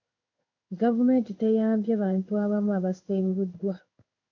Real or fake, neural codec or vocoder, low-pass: fake; codec, 16 kHz in and 24 kHz out, 1 kbps, XY-Tokenizer; 7.2 kHz